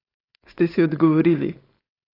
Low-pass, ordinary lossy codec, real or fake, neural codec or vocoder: 5.4 kHz; AAC, 24 kbps; fake; codec, 16 kHz, 4.8 kbps, FACodec